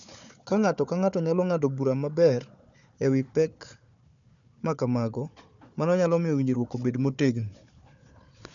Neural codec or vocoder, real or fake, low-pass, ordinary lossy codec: codec, 16 kHz, 4 kbps, FunCodec, trained on Chinese and English, 50 frames a second; fake; 7.2 kHz; none